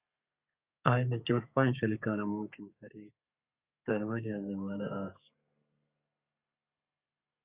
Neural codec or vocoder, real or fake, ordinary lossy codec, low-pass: codec, 32 kHz, 1.9 kbps, SNAC; fake; Opus, 64 kbps; 3.6 kHz